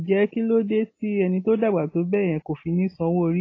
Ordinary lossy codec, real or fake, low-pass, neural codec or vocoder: AAC, 32 kbps; real; 7.2 kHz; none